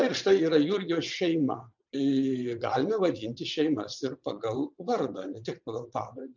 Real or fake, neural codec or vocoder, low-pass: fake; vocoder, 22.05 kHz, 80 mel bands, WaveNeXt; 7.2 kHz